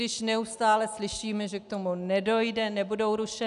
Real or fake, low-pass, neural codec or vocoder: real; 10.8 kHz; none